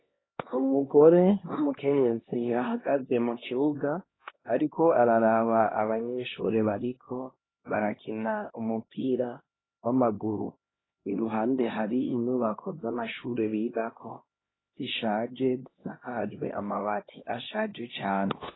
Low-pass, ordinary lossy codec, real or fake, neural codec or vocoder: 7.2 kHz; AAC, 16 kbps; fake; codec, 16 kHz, 1 kbps, X-Codec, HuBERT features, trained on LibriSpeech